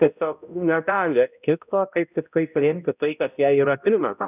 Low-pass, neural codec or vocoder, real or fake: 3.6 kHz; codec, 16 kHz, 0.5 kbps, X-Codec, HuBERT features, trained on balanced general audio; fake